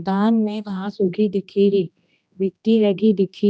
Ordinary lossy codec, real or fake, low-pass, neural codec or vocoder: none; fake; none; codec, 16 kHz, 1 kbps, X-Codec, HuBERT features, trained on general audio